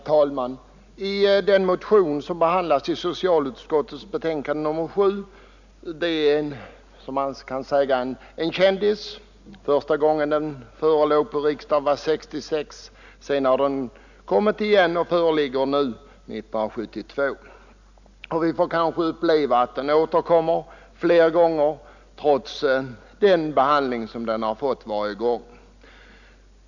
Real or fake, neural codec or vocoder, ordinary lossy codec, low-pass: real; none; none; 7.2 kHz